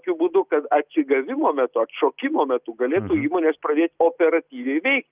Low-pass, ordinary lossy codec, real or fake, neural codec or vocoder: 3.6 kHz; Opus, 24 kbps; real; none